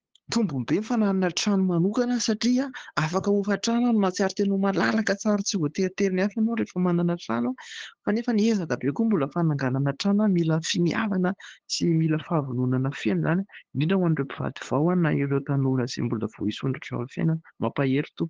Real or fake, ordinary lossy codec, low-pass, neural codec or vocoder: fake; Opus, 16 kbps; 7.2 kHz; codec, 16 kHz, 8 kbps, FunCodec, trained on LibriTTS, 25 frames a second